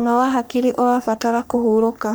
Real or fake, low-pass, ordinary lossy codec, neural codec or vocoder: fake; none; none; codec, 44.1 kHz, 3.4 kbps, Pupu-Codec